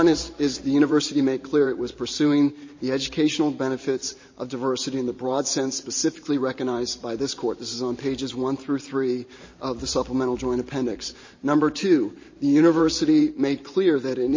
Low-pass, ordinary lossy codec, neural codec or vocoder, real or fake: 7.2 kHz; MP3, 32 kbps; vocoder, 44.1 kHz, 128 mel bands every 512 samples, BigVGAN v2; fake